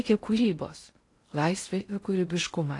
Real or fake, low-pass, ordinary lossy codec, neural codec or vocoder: fake; 10.8 kHz; AAC, 48 kbps; codec, 16 kHz in and 24 kHz out, 0.6 kbps, FocalCodec, streaming, 2048 codes